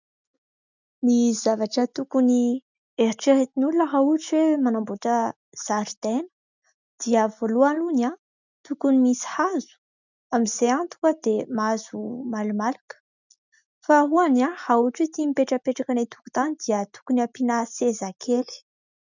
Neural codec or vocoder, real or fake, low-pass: none; real; 7.2 kHz